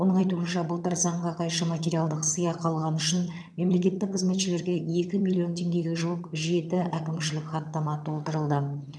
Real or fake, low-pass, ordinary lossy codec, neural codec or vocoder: fake; none; none; vocoder, 22.05 kHz, 80 mel bands, HiFi-GAN